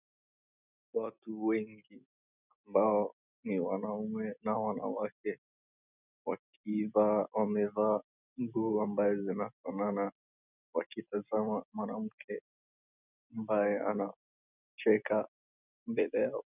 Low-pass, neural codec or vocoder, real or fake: 3.6 kHz; none; real